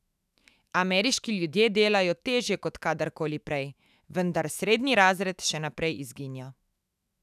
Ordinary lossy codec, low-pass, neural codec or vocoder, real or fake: none; 14.4 kHz; autoencoder, 48 kHz, 128 numbers a frame, DAC-VAE, trained on Japanese speech; fake